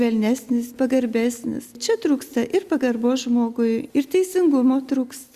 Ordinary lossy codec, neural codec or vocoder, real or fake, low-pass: Opus, 64 kbps; none; real; 14.4 kHz